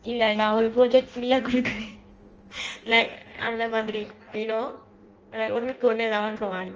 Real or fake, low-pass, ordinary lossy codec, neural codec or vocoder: fake; 7.2 kHz; Opus, 24 kbps; codec, 16 kHz in and 24 kHz out, 0.6 kbps, FireRedTTS-2 codec